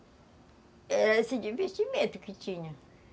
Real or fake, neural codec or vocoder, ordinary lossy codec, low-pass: real; none; none; none